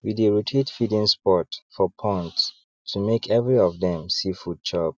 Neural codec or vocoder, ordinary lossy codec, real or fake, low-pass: none; none; real; none